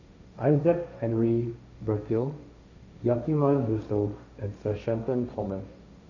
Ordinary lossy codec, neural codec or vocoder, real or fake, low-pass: none; codec, 16 kHz, 1.1 kbps, Voila-Tokenizer; fake; 7.2 kHz